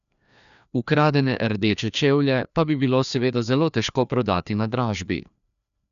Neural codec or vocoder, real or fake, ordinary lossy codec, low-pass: codec, 16 kHz, 2 kbps, FreqCodec, larger model; fake; none; 7.2 kHz